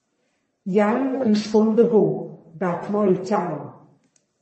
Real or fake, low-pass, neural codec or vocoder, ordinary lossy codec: fake; 10.8 kHz; codec, 44.1 kHz, 1.7 kbps, Pupu-Codec; MP3, 32 kbps